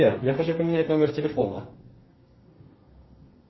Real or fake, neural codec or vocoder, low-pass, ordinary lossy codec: fake; codec, 32 kHz, 1.9 kbps, SNAC; 7.2 kHz; MP3, 24 kbps